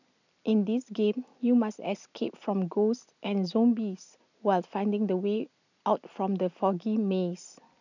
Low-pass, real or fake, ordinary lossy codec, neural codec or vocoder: 7.2 kHz; real; none; none